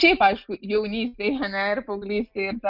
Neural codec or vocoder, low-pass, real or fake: none; 5.4 kHz; real